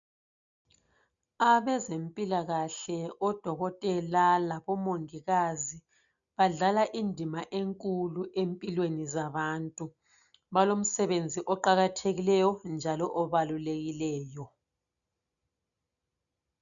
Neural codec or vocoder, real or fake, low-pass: none; real; 7.2 kHz